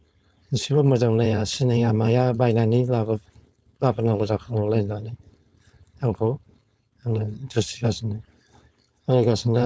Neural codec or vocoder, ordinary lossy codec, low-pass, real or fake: codec, 16 kHz, 4.8 kbps, FACodec; none; none; fake